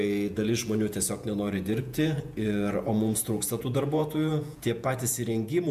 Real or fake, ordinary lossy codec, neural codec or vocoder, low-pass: fake; MP3, 96 kbps; vocoder, 48 kHz, 128 mel bands, Vocos; 14.4 kHz